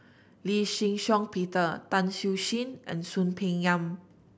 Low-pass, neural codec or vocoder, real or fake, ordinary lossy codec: none; none; real; none